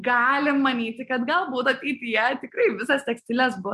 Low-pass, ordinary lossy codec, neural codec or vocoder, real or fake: 14.4 kHz; MP3, 64 kbps; none; real